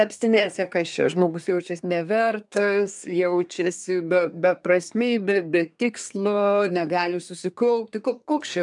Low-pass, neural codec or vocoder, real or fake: 10.8 kHz; codec, 24 kHz, 1 kbps, SNAC; fake